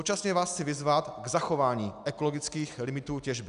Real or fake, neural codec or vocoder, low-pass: real; none; 10.8 kHz